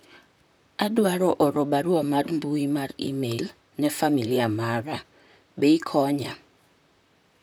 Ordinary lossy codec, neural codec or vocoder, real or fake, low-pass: none; vocoder, 44.1 kHz, 128 mel bands, Pupu-Vocoder; fake; none